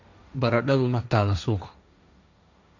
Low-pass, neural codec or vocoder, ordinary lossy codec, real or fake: 7.2 kHz; codec, 16 kHz, 1.1 kbps, Voila-Tokenizer; none; fake